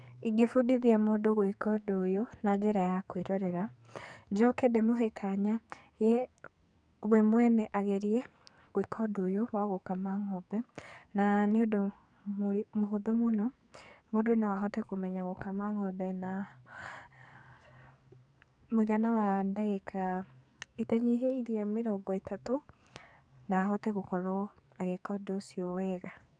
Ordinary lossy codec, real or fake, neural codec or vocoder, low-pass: none; fake; codec, 44.1 kHz, 2.6 kbps, SNAC; 9.9 kHz